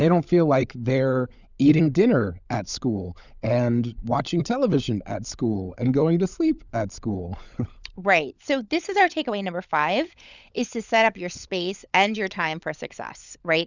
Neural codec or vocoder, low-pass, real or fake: codec, 16 kHz, 16 kbps, FunCodec, trained on LibriTTS, 50 frames a second; 7.2 kHz; fake